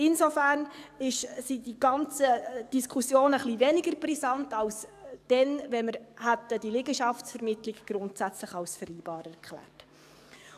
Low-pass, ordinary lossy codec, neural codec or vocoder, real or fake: 14.4 kHz; none; codec, 44.1 kHz, 7.8 kbps, DAC; fake